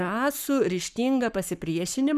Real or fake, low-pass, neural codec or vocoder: fake; 14.4 kHz; codec, 44.1 kHz, 7.8 kbps, Pupu-Codec